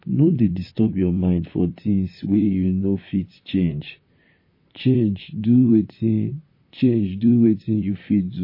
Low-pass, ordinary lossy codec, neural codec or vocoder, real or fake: 5.4 kHz; MP3, 24 kbps; vocoder, 44.1 kHz, 128 mel bands, Pupu-Vocoder; fake